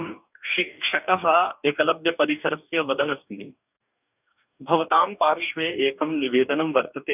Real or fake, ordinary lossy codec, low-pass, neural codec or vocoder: fake; none; 3.6 kHz; codec, 44.1 kHz, 2.6 kbps, DAC